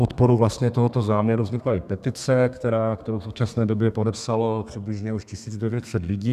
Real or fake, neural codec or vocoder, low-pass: fake; codec, 32 kHz, 1.9 kbps, SNAC; 14.4 kHz